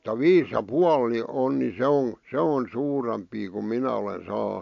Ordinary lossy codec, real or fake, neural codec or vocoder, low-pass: AAC, 64 kbps; real; none; 7.2 kHz